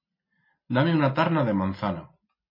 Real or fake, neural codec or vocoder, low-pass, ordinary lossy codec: real; none; 5.4 kHz; MP3, 24 kbps